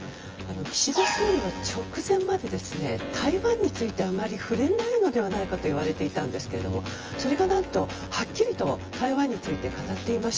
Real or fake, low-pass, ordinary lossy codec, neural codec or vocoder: fake; 7.2 kHz; Opus, 24 kbps; vocoder, 24 kHz, 100 mel bands, Vocos